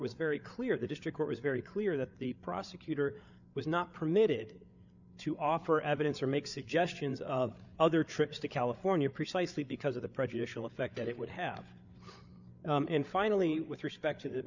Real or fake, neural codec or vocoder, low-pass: fake; codec, 16 kHz, 8 kbps, FreqCodec, larger model; 7.2 kHz